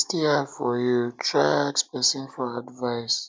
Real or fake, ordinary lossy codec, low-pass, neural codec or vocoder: real; none; none; none